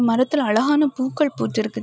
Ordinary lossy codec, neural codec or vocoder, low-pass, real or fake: none; none; none; real